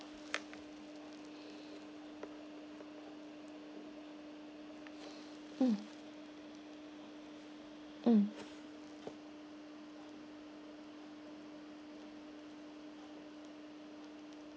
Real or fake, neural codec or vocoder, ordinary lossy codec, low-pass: real; none; none; none